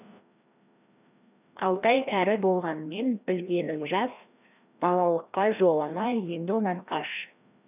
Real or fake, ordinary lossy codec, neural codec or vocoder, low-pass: fake; none; codec, 16 kHz, 1 kbps, FreqCodec, larger model; 3.6 kHz